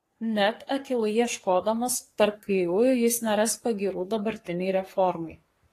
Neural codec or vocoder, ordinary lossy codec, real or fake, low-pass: codec, 44.1 kHz, 3.4 kbps, Pupu-Codec; AAC, 48 kbps; fake; 14.4 kHz